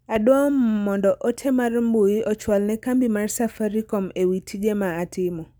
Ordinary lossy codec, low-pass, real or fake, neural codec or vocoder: none; none; real; none